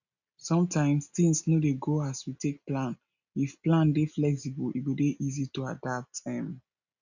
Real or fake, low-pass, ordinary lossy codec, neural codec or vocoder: real; 7.2 kHz; none; none